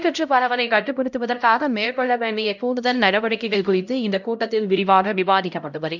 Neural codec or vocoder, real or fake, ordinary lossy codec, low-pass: codec, 16 kHz, 0.5 kbps, X-Codec, HuBERT features, trained on LibriSpeech; fake; none; 7.2 kHz